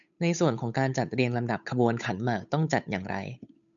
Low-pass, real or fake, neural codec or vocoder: 7.2 kHz; fake; codec, 16 kHz, 8 kbps, FunCodec, trained on Chinese and English, 25 frames a second